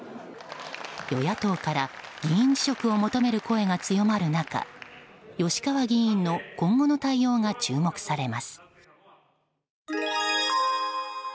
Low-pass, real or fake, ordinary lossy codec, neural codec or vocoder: none; real; none; none